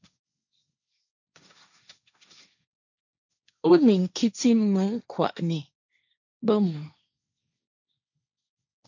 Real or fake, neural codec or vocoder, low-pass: fake; codec, 16 kHz, 1.1 kbps, Voila-Tokenizer; 7.2 kHz